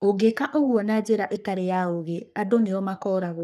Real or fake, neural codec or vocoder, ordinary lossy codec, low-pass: fake; codec, 44.1 kHz, 3.4 kbps, Pupu-Codec; none; 14.4 kHz